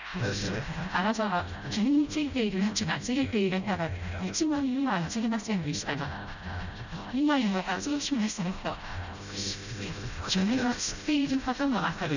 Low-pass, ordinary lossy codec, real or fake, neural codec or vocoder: 7.2 kHz; none; fake; codec, 16 kHz, 0.5 kbps, FreqCodec, smaller model